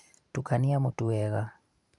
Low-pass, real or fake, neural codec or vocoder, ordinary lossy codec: 10.8 kHz; fake; vocoder, 44.1 kHz, 128 mel bands every 512 samples, BigVGAN v2; none